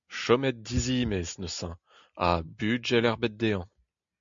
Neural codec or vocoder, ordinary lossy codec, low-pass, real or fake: none; MP3, 64 kbps; 7.2 kHz; real